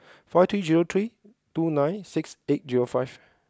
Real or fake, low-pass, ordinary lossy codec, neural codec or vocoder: real; none; none; none